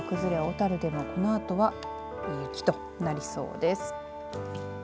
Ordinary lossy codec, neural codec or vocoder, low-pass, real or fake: none; none; none; real